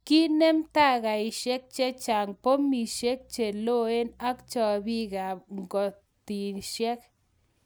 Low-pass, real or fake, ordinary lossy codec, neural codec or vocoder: none; real; none; none